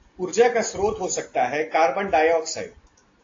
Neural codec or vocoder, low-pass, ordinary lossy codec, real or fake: none; 7.2 kHz; AAC, 32 kbps; real